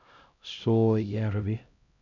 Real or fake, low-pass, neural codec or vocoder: fake; 7.2 kHz; codec, 16 kHz, 0.5 kbps, X-Codec, HuBERT features, trained on LibriSpeech